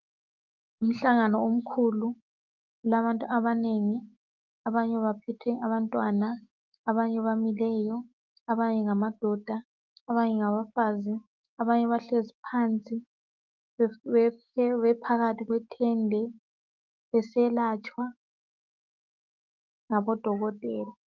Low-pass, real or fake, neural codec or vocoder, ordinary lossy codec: 7.2 kHz; fake; autoencoder, 48 kHz, 128 numbers a frame, DAC-VAE, trained on Japanese speech; Opus, 32 kbps